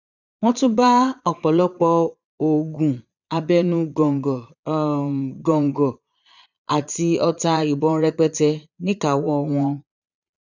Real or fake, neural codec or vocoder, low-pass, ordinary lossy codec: fake; vocoder, 22.05 kHz, 80 mel bands, Vocos; 7.2 kHz; none